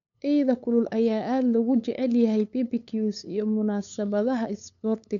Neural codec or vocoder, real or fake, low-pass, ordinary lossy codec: codec, 16 kHz, 8 kbps, FunCodec, trained on LibriTTS, 25 frames a second; fake; 7.2 kHz; none